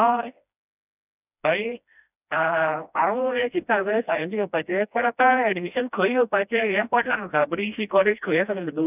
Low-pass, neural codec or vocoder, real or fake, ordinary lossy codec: 3.6 kHz; codec, 16 kHz, 1 kbps, FreqCodec, smaller model; fake; none